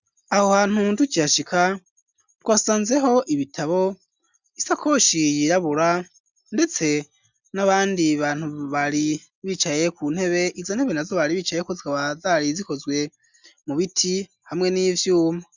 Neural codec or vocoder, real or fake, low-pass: none; real; 7.2 kHz